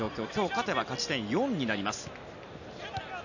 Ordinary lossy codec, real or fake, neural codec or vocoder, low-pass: none; real; none; 7.2 kHz